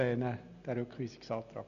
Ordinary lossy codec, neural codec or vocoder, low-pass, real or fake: none; none; 7.2 kHz; real